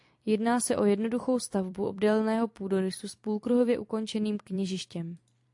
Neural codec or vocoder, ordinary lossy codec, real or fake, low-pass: vocoder, 44.1 kHz, 128 mel bands every 256 samples, BigVGAN v2; AAC, 64 kbps; fake; 10.8 kHz